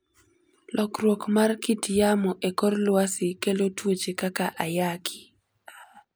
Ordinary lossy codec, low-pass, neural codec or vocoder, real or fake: none; none; none; real